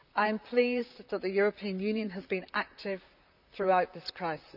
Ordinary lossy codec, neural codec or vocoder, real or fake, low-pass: none; vocoder, 44.1 kHz, 128 mel bands, Pupu-Vocoder; fake; 5.4 kHz